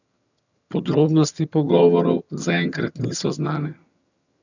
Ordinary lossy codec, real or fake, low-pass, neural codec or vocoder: none; fake; 7.2 kHz; vocoder, 22.05 kHz, 80 mel bands, HiFi-GAN